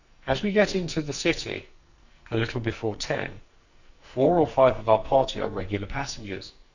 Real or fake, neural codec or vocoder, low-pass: fake; codec, 44.1 kHz, 2.6 kbps, SNAC; 7.2 kHz